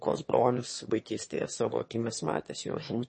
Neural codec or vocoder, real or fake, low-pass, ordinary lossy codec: autoencoder, 22.05 kHz, a latent of 192 numbers a frame, VITS, trained on one speaker; fake; 9.9 kHz; MP3, 32 kbps